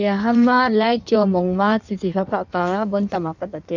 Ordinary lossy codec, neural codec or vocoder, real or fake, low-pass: none; codec, 16 kHz in and 24 kHz out, 1.1 kbps, FireRedTTS-2 codec; fake; 7.2 kHz